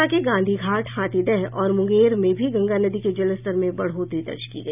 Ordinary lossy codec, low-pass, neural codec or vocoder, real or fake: none; 3.6 kHz; none; real